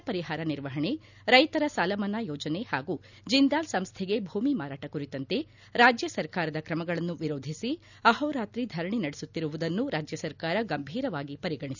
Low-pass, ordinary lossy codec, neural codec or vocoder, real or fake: 7.2 kHz; none; none; real